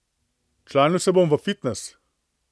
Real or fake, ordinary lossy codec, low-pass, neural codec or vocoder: real; none; none; none